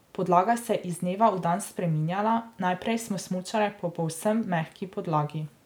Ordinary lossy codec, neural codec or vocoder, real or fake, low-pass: none; none; real; none